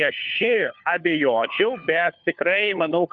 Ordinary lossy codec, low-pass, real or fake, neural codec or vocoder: Opus, 64 kbps; 7.2 kHz; fake; codec, 16 kHz, 4 kbps, FunCodec, trained on LibriTTS, 50 frames a second